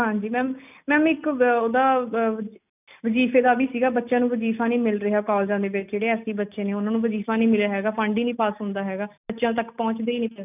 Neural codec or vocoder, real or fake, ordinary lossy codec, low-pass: none; real; none; 3.6 kHz